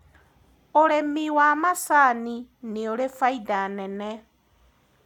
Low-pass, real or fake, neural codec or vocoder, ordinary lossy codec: 19.8 kHz; real; none; none